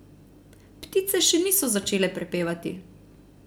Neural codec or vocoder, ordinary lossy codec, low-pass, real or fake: none; none; none; real